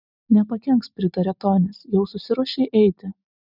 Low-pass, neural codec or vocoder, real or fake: 5.4 kHz; none; real